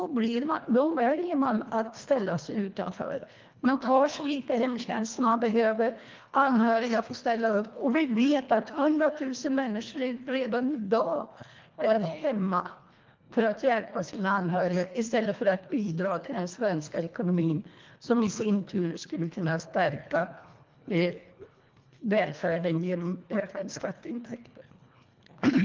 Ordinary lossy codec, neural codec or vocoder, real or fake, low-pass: Opus, 24 kbps; codec, 24 kHz, 1.5 kbps, HILCodec; fake; 7.2 kHz